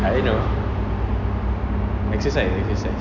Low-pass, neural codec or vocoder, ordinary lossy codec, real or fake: 7.2 kHz; none; none; real